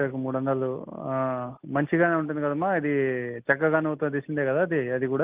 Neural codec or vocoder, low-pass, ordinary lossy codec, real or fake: none; 3.6 kHz; Opus, 32 kbps; real